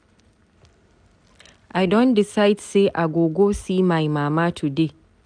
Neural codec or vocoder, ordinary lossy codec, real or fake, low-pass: none; none; real; 9.9 kHz